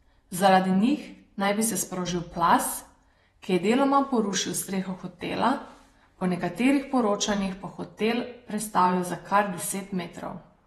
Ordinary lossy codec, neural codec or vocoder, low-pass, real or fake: AAC, 32 kbps; vocoder, 48 kHz, 128 mel bands, Vocos; 19.8 kHz; fake